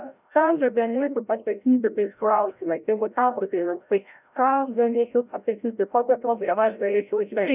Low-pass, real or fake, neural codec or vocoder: 3.6 kHz; fake; codec, 16 kHz, 0.5 kbps, FreqCodec, larger model